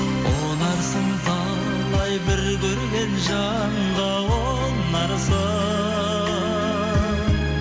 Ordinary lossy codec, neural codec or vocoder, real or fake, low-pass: none; none; real; none